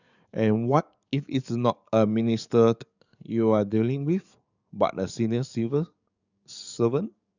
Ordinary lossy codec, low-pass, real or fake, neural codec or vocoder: none; 7.2 kHz; fake; codec, 44.1 kHz, 7.8 kbps, DAC